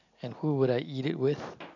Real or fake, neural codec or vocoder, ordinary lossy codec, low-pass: real; none; none; 7.2 kHz